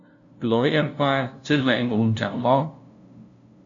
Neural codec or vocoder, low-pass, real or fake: codec, 16 kHz, 0.5 kbps, FunCodec, trained on LibriTTS, 25 frames a second; 7.2 kHz; fake